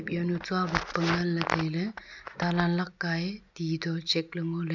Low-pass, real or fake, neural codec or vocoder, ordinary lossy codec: 7.2 kHz; real; none; none